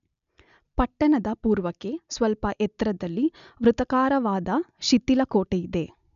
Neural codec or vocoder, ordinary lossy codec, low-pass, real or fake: none; none; 7.2 kHz; real